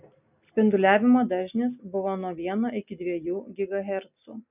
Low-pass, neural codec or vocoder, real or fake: 3.6 kHz; none; real